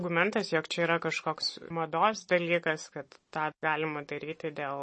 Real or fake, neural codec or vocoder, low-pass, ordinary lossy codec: real; none; 10.8 kHz; MP3, 32 kbps